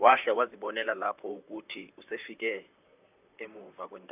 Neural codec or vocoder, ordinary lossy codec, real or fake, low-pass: vocoder, 44.1 kHz, 128 mel bands, Pupu-Vocoder; none; fake; 3.6 kHz